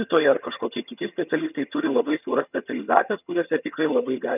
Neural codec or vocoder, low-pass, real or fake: vocoder, 22.05 kHz, 80 mel bands, HiFi-GAN; 3.6 kHz; fake